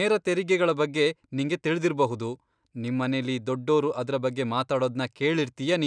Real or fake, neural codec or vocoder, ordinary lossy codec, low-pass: real; none; none; 9.9 kHz